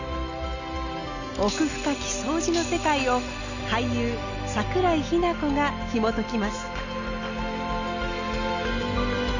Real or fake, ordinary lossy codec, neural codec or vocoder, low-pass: real; Opus, 64 kbps; none; 7.2 kHz